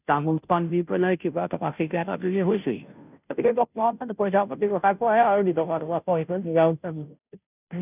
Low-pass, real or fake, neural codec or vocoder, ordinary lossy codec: 3.6 kHz; fake; codec, 16 kHz, 0.5 kbps, FunCodec, trained on Chinese and English, 25 frames a second; none